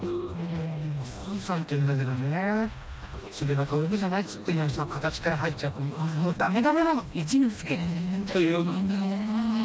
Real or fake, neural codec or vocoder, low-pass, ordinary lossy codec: fake; codec, 16 kHz, 1 kbps, FreqCodec, smaller model; none; none